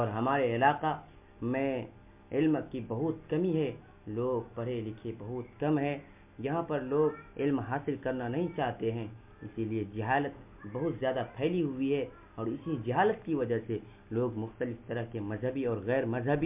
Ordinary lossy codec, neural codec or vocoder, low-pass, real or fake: MP3, 32 kbps; none; 3.6 kHz; real